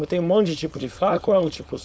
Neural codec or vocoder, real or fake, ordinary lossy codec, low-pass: codec, 16 kHz, 4.8 kbps, FACodec; fake; none; none